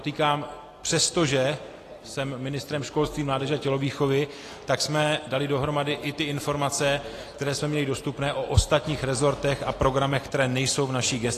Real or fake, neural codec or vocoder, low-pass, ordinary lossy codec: real; none; 14.4 kHz; AAC, 48 kbps